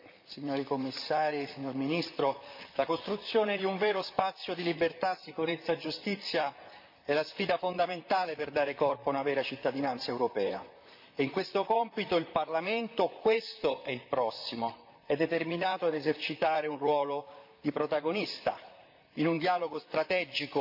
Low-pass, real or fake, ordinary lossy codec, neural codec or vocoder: 5.4 kHz; fake; MP3, 48 kbps; vocoder, 44.1 kHz, 128 mel bands, Pupu-Vocoder